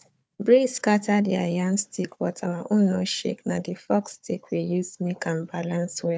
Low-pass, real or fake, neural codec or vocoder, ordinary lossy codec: none; fake; codec, 16 kHz, 4 kbps, FunCodec, trained on Chinese and English, 50 frames a second; none